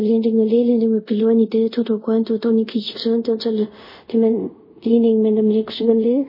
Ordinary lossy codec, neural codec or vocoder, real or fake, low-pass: MP3, 24 kbps; codec, 24 kHz, 0.5 kbps, DualCodec; fake; 5.4 kHz